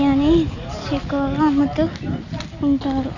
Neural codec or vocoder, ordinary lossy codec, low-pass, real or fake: none; none; 7.2 kHz; real